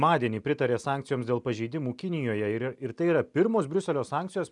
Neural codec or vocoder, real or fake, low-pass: none; real; 10.8 kHz